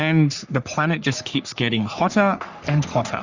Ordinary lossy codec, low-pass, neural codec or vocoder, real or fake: Opus, 64 kbps; 7.2 kHz; codec, 44.1 kHz, 3.4 kbps, Pupu-Codec; fake